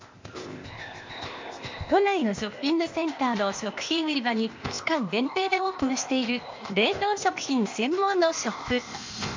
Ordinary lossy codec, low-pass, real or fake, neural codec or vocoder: MP3, 48 kbps; 7.2 kHz; fake; codec, 16 kHz, 0.8 kbps, ZipCodec